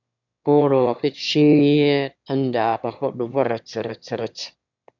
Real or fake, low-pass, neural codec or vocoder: fake; 7.2 kHz; autoencoder, 22.05 kHz, a latent of 192 numbers a frame, VITS, trained on one speaker